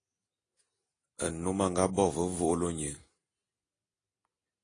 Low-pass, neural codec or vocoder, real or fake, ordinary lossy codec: 9.9 kHz; none; real; AAC, 32 kbps